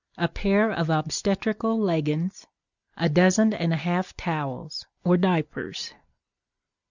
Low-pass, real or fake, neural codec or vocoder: 7.2 kHz; real; none